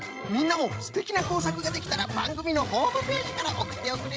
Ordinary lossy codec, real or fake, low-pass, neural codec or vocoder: none; fake; none; codec, 16 kHz, 16 kbps, FreqCodec, larger model